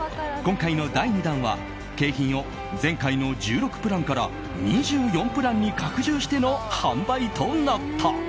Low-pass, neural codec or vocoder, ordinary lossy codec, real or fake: none; none; none; real